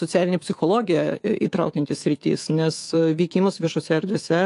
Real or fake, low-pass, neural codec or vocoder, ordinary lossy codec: fake; 10.8 kHz; codec, 24 kHz, 3.1 kbps, DualCodec; AAC, 48 kbps